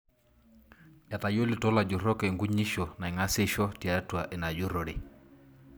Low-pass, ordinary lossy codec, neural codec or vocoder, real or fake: none; none; none; real